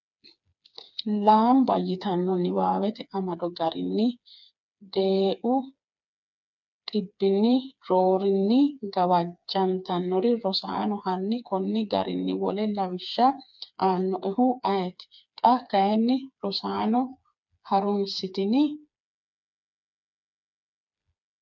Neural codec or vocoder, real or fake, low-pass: codec, 16 kHz, 4 kbps, FreqCodec, smaller model; fake; 7.2 kHz